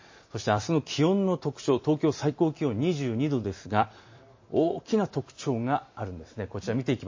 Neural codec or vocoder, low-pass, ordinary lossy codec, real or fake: vocoder, 44.1 kHz, 128 mel bands every 256 samples, BigVGAN v2; 7.2 kHz; MP3, 32 kbps; fake